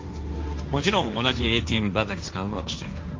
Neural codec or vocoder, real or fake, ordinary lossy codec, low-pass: codec, 16 kHz, 1.1 kbps, Voila-Tokenizer; fake; Opus, 24 kbps; 7.2 kHz